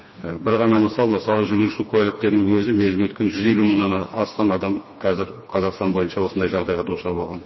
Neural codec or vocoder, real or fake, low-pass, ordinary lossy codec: codec, 16 kHz, 2 kbps, FreqCodec, smaller model; fake; 7.2 kHz; MP3, 24 kbps